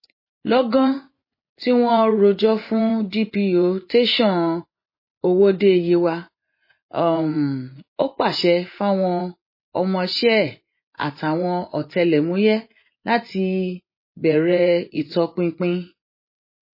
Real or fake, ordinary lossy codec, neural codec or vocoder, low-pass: fake; MP3, 24 kbps; vocoder, 24 kHz, 100 mel bands, Vocos; 5.4 kHz